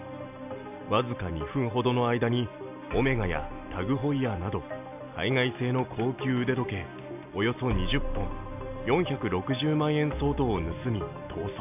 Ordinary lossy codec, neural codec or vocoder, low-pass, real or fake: none; none; 3.6 kHz; real